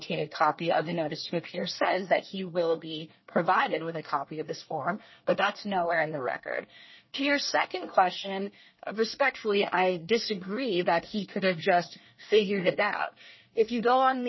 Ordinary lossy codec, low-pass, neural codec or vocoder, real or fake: MP3, 24 kbps; 7.2 kHz; codec, 24 kHz, 1 kbps, SNAC; fake